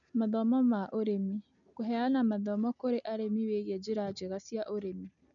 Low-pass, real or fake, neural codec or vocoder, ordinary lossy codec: 7.2 kHz; real; none; none